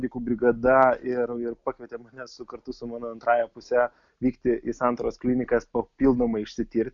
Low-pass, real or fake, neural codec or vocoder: 7.2 kHz; real; none